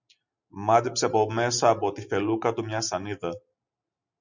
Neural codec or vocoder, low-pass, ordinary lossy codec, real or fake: none; 7.2 kHz; Opus, 64 kbps; real